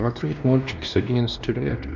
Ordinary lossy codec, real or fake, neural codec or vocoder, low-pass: none; fake; codec, 16 kHz, 1 kbps, X-Codec, WavLM features, trained on Multilingual LibriSpeech; 7.2 kHz